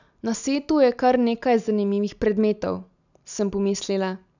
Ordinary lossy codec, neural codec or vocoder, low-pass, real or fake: none; none; 7.2 kHz; real